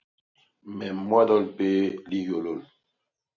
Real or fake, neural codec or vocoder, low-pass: real; none; 7.2 kHz